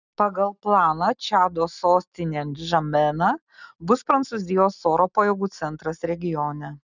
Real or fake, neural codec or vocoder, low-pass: real; none; 7.2 kHz